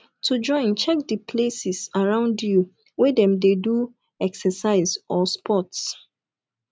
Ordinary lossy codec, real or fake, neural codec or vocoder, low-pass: none; real; none; none